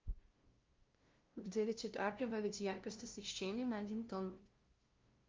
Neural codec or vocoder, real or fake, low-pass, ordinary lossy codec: codec, 16 kHz, 0.5 kbps, FunCodec, trained on LibriTTS, 25 frames a second; fake; 7.2 kHz; Opus, 24 kbps